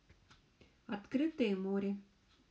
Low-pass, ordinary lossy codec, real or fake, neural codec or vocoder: none; none; real; none